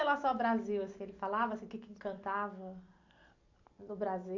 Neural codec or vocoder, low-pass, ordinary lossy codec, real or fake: none; 7.2 kHz; AAC, 48 kbps; real